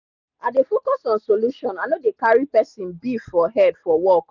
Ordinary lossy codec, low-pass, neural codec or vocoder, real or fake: none; 7.2 kHz; none; real